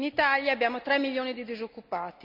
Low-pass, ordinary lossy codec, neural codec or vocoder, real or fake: 5.4 kHz; none; none; real